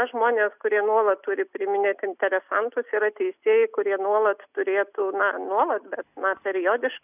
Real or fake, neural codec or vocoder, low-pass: real; none; 3.6 kHz